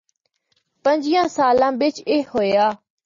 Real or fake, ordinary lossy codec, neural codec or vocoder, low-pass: real; MP3, 32 kbps; none; 7.2 kHz